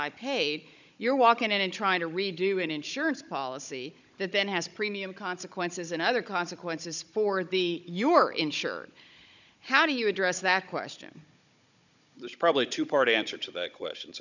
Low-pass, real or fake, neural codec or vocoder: 7.2 kHz; fake; codec, 16 kHz, 16 kbps, FunCodec, trained on Chinese and English, 50 frames a second